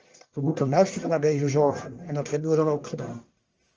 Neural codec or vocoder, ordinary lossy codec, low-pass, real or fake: codec, 44.1 kHz, 1.7 kbps, Pupu-Codec; Opus, 24 kbps; 7.2 kHz; fake